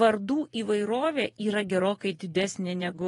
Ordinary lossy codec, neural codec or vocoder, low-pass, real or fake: AAC, 32 kbps; vocoder, 24 kHz, 100 mel bands, Vocos; 10.8 kHz; fake